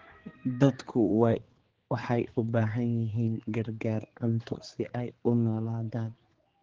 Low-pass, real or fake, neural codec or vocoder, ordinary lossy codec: 7.2 kHz; fake; codec, 16 kHz, 4 kbps, X-Codec, HuBERT features, trained on general audio; Opus, 16 kbps